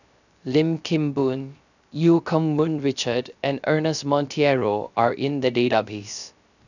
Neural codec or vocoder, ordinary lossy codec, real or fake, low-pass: codec, 16 kHz, 0.3 kbps, FocalCodec; none; fake; 7.2 kHz